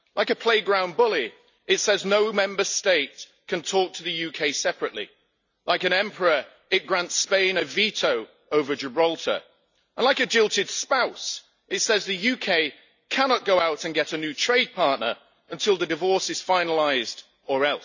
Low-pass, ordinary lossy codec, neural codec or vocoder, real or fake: 7.2 kHz; none; none; real